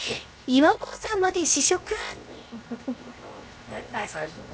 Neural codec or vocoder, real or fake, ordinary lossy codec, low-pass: codec, 16 kHz, 0.7 kbps, FocalCodec; fake; none; none